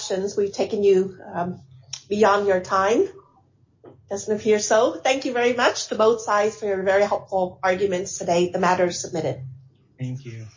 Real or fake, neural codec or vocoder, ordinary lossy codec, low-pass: real; none; MP3, 32 kbps; 7.2 kHz